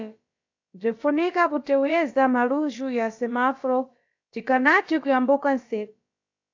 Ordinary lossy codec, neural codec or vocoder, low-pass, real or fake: AAC, 48 kbps; codec, 16 kHz, about 1 kbps, DyCAST, with the encoder's durations; 7.2 kHz; fake